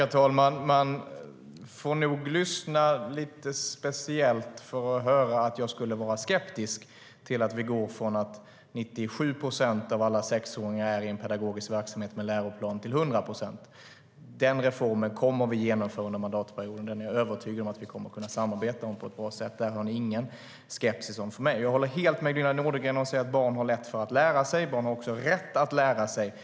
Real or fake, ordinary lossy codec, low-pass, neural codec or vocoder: real; none; none; none